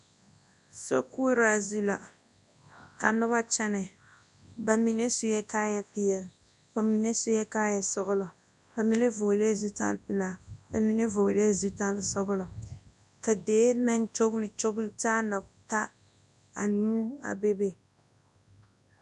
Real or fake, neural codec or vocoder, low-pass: fake; codec, 24 kHz, 0.9 kbps, WavTokenizer, large speech release; 10.8 kHz